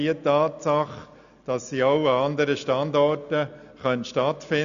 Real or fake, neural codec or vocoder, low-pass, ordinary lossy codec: real; none; 7.2 kHz; none